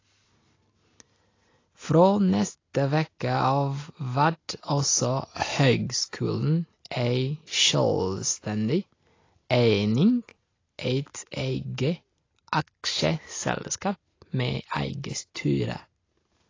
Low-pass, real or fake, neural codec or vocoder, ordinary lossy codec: 7.2 kHz; real; none; AAC, 32 kbps